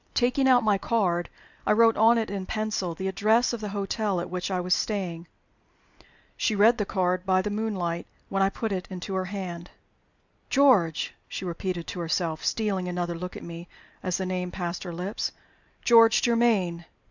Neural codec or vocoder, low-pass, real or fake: none; 7.2 kHz; real